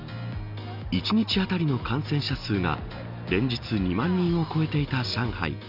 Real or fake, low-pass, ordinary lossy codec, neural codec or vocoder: real; 5.4 kHz; none; none